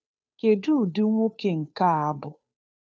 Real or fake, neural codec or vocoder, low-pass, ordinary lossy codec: fake; codec, 16 kHz, 2 kbps, FunCodec, trained on Chinese and English, 25 frames a second; none; none